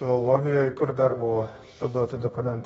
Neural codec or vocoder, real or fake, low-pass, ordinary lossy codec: codec, 24 kHz, 0.9 kbps, WavTokenizer, medium music audio release; fake; 10.8 kHz; AAC, 24 kbps